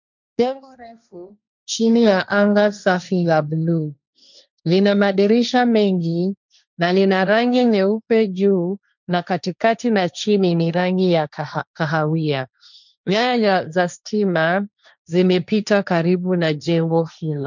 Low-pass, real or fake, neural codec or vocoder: 7.2 kHz; fake; codec, 16 kHz, 1.1 kbps, Voila-Tokenizer